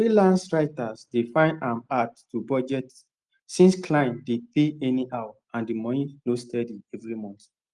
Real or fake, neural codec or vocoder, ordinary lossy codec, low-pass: real; none; Opus, 24 kbps; 10.8 kHz